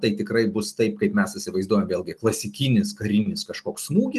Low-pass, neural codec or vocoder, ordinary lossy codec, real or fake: 14.4 kHz; none; Opus, 24 kbps; real